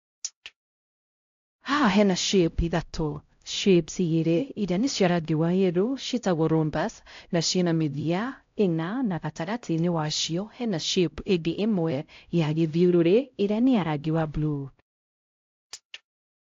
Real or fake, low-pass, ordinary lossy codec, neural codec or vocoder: fake; 7.2 kHz; AAC, 48 kbps; codec, 16 kHz, 0.5 kbps, X-Codec, HuBERT features, trained on LibriSpeech